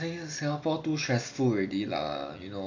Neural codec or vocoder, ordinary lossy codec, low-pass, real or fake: none; AAC, 48 kbps; 7.2 kHz; real